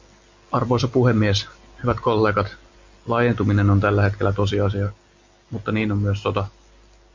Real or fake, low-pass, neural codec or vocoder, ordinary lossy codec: real; 7.2 kHz; none; MP3, 48 kbps